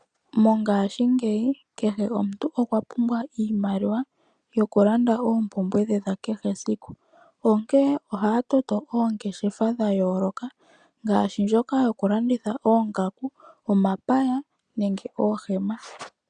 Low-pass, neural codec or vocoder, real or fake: 9.9 kHz; none; real